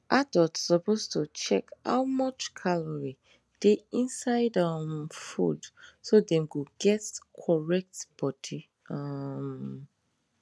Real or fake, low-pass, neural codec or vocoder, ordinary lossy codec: real; none; none; none